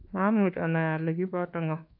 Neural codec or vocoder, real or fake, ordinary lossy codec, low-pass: codec, 24 kHz, 1.2 kbps, DualCodec; fake; AAC, 48 kbps; 5.4 kHz